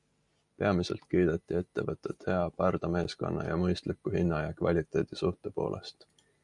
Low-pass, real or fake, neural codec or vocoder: 10.8 kHz; real; none